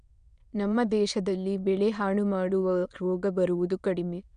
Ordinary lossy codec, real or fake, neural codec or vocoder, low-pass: none; fake; autoencoder, 22.05 kHz, a latent of 192 numbers a frame, VITS, trained on many speakers; 9.9 kHz